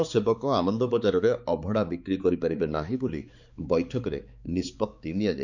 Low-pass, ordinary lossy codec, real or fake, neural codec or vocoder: 7.2 kHz; Opus, 64 kbps; fake; codec, 16 kHz, 4 kbps, X-Codec, HuBERT features, trained on balanced general audio